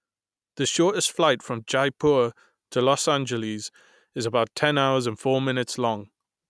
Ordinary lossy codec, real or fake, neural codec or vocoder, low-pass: none; real; none; none